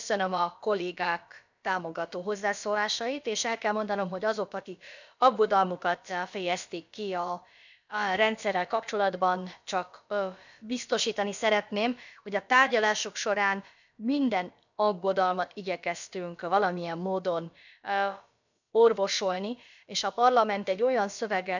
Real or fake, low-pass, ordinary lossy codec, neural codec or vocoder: fake; 7.2 kHz; none; codec, 16 kHz, about 1 kbps, DyCAST, with the encoder's durations